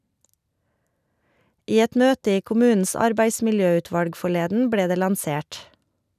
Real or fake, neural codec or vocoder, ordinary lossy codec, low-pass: real; none; none; 14.4 kHz